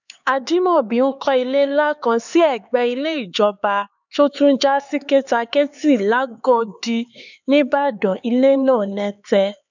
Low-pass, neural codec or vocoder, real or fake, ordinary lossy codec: 7.2 kHz; codec, 16 kHz, 4 kbps, X-Codec, HuBERT features, trained on LibriSpeech; fake; none